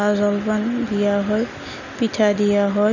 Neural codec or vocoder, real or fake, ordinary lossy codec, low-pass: none; real; none; 7.2 kHz